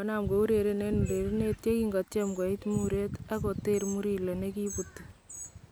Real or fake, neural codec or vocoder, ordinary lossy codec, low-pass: real; none; none; none